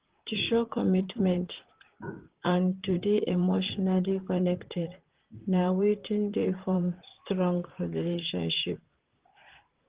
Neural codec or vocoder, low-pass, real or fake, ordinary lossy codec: vocoder, 44.1 kHz, 128 mel bands, Pupu-Vocoder; 3.6 kHz; fake; Opus, 16 kbps